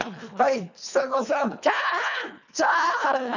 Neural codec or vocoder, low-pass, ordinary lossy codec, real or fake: codec, 24 kHz, 1.5 kbps, HILCodec; 7.2 kHz; none; fake